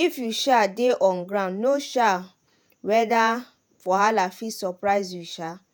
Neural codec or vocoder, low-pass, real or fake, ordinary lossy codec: vocoder, 48 kHz, 128 mel bands, Vocos; none; fake; none